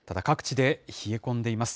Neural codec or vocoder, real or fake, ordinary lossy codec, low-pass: none; real; none; none